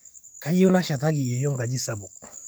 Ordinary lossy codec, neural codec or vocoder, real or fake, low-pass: none; codec, 44.1 kHz, 7.8 kbps, DAC; fake; none